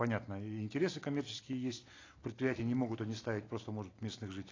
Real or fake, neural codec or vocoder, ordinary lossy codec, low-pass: real; none; AAC, 32 kbps; 7.2 kHz